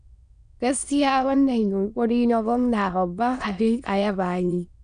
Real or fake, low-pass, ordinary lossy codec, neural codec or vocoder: fake; 9.9 kHz; AAC, 96 kbps; autoencoder, 22.05 kHz, a latent of 192 numbers a frame, VITS, trained on many speakers